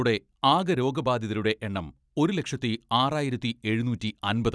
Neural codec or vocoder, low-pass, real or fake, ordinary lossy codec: none; none; real; none